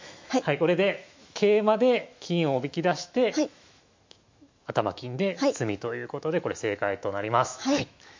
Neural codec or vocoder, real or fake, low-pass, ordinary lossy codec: autoencoder, 48 kHz, 128 numbers a frame, DAC-VAE, trained on Japanese speech; fake; 7.2 kHz; MP3, 48 kbps